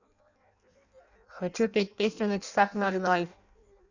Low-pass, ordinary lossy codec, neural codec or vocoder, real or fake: 7.2 kHz; none; codec, 16 kHz in and 24 kHz out, 0.6 kbps, FireRedTTS-2 codec; fake